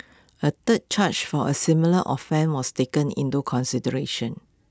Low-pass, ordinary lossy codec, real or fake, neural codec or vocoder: none; none; real; none